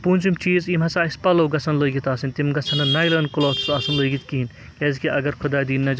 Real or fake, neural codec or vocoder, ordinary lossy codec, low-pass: real; none; none; none